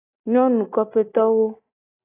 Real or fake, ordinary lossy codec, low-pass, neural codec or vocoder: real; AAC, 16 kbps; 3.6 kHz; none